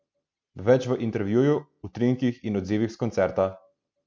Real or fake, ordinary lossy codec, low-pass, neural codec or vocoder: real; none; none; none